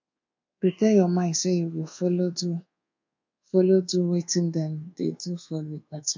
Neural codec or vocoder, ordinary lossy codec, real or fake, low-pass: codec, 24 kHz, 1.2 kbps, DualCodec; MP3, 48 kbps; fake; 7.2 kHz